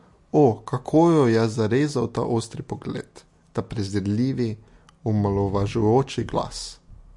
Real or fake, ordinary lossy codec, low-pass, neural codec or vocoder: real; MP3, 48 kbps; 10.8 kHz; none